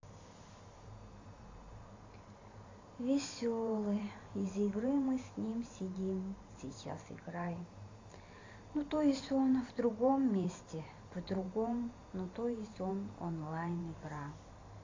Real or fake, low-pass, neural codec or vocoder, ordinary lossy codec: fake; 7.2 kHz; vocoder, 44.1 kHz, 128 mel bands every 512 samples, BigVGAN v2; AAC, 32 kbps